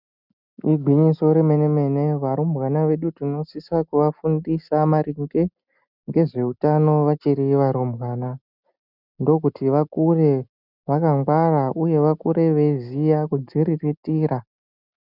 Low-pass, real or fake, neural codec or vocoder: 5.4 kHz; real; none